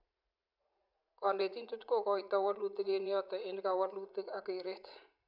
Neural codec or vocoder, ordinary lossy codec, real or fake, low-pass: vocoder, 44.1 kHz, 80 mel bands, Vocos; none; fake; 5.4 kHz